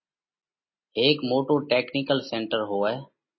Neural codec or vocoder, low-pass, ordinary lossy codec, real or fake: none; 7.2 kHz; MP3, 24 kbps; real